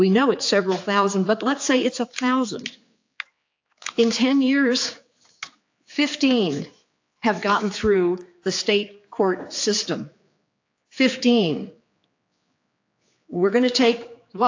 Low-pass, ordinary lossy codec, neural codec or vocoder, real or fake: 7.2 kHz; AAC, 48 kbps; codec, 16 kHz, 4 kbps, X-Codec, HuBERT features, trained on general audio; fake